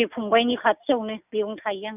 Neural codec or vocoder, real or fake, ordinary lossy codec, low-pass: none; real; none; 3.6 kHz